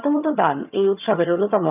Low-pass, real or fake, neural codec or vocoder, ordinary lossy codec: 3.6 kHz; fake; vocoder, 22.05 kHz, 80 mel bands, HiFi-GAN; none